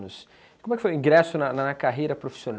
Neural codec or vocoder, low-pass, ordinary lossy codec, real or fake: none; none; none; real